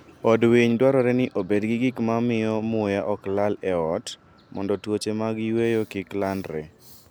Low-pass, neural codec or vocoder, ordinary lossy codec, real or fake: none; none; none; real